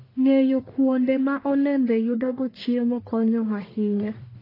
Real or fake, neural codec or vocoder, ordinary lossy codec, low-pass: fake; codec, 44.1 kHz, 1.7 kbps, Pupu-Codec; AAC, 24 kbps; 5.4 kHz